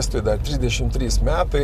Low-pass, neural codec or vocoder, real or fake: 14.4 kHz; none; real